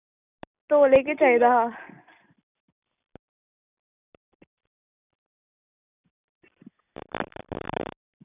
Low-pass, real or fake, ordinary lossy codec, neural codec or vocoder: 3.6 kHz; real; none; none